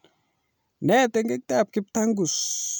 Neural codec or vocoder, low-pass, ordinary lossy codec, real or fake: none; none; none; real